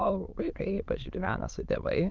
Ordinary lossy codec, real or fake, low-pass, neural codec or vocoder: Opus, 24 kbps; fake; 7.2 kHz; autoencoder, 22.05 kHz, a latent of 192 numbers a frame, VITS, trained on many speakers